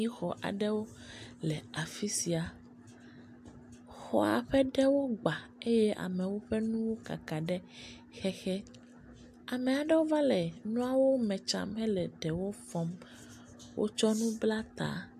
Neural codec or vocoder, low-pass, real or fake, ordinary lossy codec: none; 14.4 kHz; real; AAC, 96 kbps